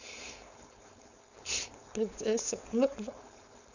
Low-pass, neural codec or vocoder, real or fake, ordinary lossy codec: 7.2 kHz; codec, 16 kHz, 4.8 kbps, FACodec; fake; none